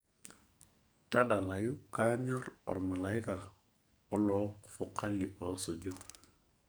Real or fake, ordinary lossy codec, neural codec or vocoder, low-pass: fake; none; codec, 44.1 kHz, 2.6 kbps, SNAC; none